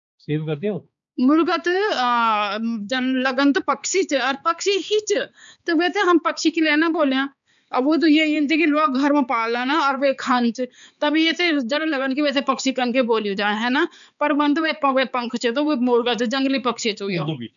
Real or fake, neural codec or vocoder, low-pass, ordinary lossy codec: fake; codec, 16 kHz, 4 kbps, X-Codec, HuBERT features, trained on balanced general audio; 7.2 kHz; none